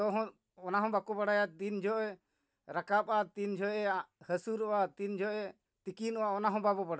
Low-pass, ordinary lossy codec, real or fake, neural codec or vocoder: none; none; real; none